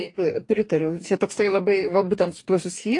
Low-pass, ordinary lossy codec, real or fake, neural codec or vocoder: 10.8 kHz; AAC, 48 kbps; fake; codec, 44.1 kHz, 2.6 kbps, DAC